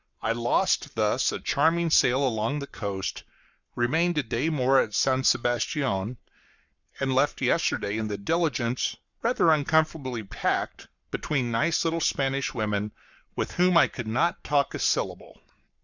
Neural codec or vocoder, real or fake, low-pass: codec, 44.1 kHz, 7.8 kbps, Pupu-Codec; fake; 7.2 kHz